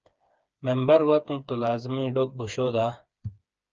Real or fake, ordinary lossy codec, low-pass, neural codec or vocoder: fake; Opus, 24 kbps; 7.2 kHz; codec, 16 kHz, 4 kbps, FreqCodec, smaller model